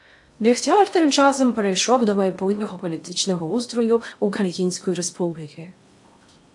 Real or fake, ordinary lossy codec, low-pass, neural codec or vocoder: fake; AAC, 64 kbps; 10.8 kHz; codec, 16 kHz in and 24 kHz out, 0.6 kbps, FocalCodec, streaming, 2048 codes